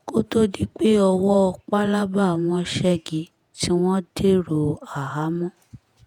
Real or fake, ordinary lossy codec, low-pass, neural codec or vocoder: fake; none; 19.8 kHz; vocoder, 48 kHz, 128 mel bands, Vocos